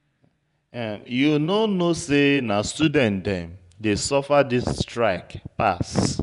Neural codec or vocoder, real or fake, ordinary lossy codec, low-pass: vocoder, 24 kHz, 100 mel bands, Vocos; fake; none; 10.8 kHz